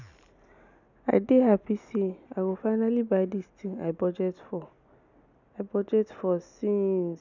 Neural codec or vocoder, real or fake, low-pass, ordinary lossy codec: none; real; 7.2 kHz; none